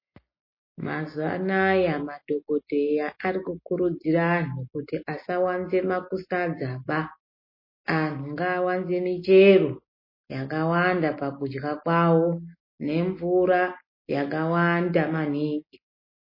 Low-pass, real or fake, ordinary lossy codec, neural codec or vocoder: 5.4 kHz; real; MP3, 24 kbps; none